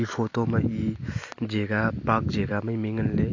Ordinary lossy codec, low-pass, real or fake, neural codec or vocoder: AAC, 48 kbps; 7.2 kHz; real; none